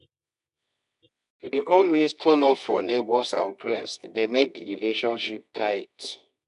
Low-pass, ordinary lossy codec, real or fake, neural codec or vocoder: 10.8 kHz; none; fake; codec, 24 kHz, 0.9 kbps, WavTokenizer, medium music audio release